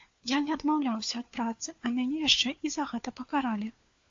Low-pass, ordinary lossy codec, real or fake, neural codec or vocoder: 7.2 kHz; AAC, 48 kbps; fake; codec, 16 kHz, 4 kbps, FunCodec, trained on LibriTTS, 50 frames a second